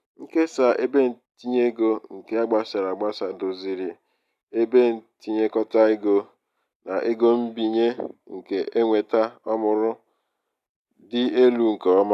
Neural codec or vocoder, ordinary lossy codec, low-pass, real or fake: none; none; 14.4 kHz; real